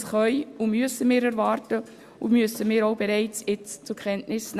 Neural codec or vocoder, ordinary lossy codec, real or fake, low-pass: none; Opus, 64 kbps; real; 14.4 kHz